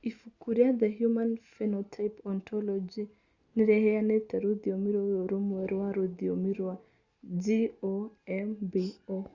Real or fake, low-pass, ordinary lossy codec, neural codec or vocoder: real; 7.2 kHz; Opus, 64 kbps; none